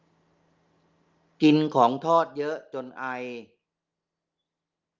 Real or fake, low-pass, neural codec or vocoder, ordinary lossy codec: real; 7.2 kHz; none; Opus, 32 kbps